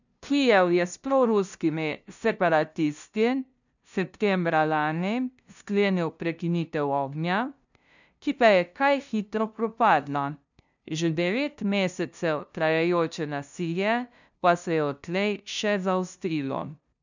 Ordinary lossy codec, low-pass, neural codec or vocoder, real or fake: none; 7.2 kHz; codec, 16 kHz, 0.5 kbps, FunCodec, trained on LibriTTS, 25 frames a second; fake